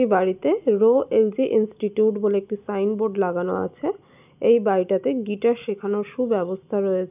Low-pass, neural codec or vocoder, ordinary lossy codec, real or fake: 3.6 kHz; none; none; real